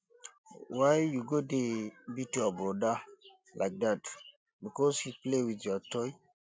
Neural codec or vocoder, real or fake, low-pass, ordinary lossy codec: none; real; none; none